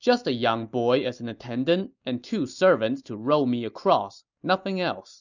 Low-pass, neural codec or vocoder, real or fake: 7.2 kHz; none; real